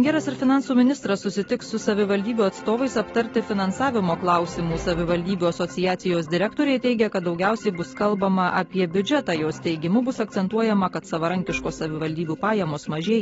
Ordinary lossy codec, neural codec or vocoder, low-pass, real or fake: AAC, 24 kbps; none; 10.8 kHz; real